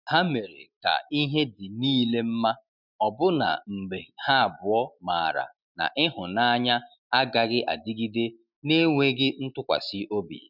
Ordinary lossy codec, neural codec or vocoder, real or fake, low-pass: none; none; real; 5.4 kHz